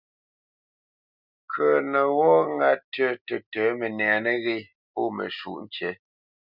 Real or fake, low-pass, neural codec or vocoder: real; 5.4 kHz; none